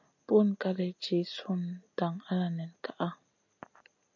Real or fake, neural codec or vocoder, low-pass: real; none; 7.2 kHz